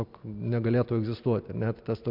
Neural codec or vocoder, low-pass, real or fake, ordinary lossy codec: none; 5.4 kHz; real; MP3, 48 kbps